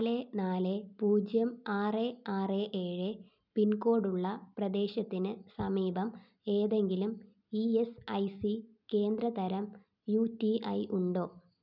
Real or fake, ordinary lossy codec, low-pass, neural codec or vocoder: real; none; 5.4 kHz; none